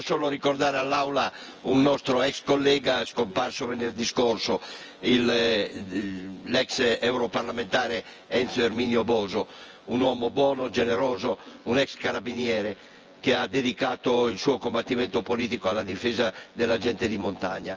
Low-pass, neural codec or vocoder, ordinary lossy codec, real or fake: 7.2 kHz; vocoder, 24 kHz, 100 mel bands, Vocos; Opus, 24 kbps; fake